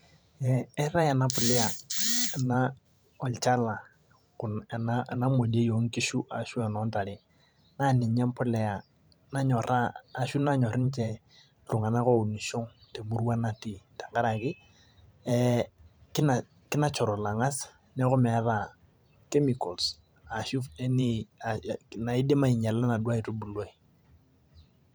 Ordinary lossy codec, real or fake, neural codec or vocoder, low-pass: none; fake; vocoder, 44.1 kHz, 128 mel bands every 512 samples, BigVGAN v2; none